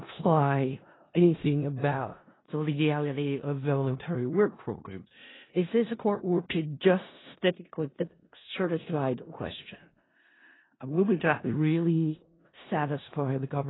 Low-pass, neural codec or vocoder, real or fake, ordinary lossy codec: 7.2 kHz; codec, 16 kHz in and 24 kHz out, 0.4 kbps, LongCat-Audio-Codec, four codebook decoder; fake; AAC, 16 kbps